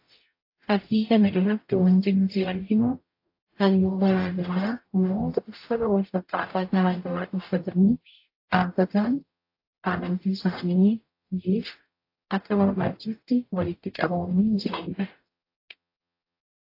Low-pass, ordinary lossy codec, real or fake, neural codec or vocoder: 5.4 kHz; AAC, 32 kbps; fake; codec, 44.1 kHz, 0.9 kbps, DAC